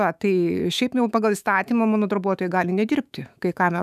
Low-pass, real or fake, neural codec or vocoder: 14.4 kHz; fake; autoencoder, 48 kHz, 128 numbers a frame, DAC-VAE, trained on Japanese speech